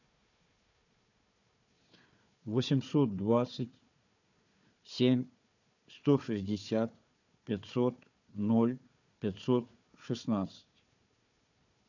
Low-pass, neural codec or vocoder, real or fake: 7.2 kHz; codec, 16 kHz, 4 kbps, FunCodec, trained on Chinese and English, 50 frames a second; fake